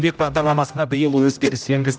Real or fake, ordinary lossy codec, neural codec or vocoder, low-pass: fake; none; codec, 16 kHz, 0.5 kbps, X-Codec, HuBERT features, trained on general audio; none